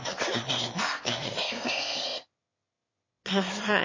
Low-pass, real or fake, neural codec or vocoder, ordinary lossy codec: 7.2 kHz; fake; autoencoder, 22.05 kHz, a latent of 192 numbers a frame, VITS, trained on one speaker; MP3, 32 kbps